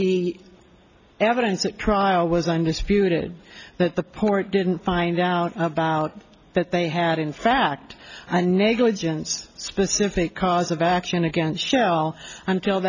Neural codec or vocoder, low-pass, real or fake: none; 7.2 kHz; real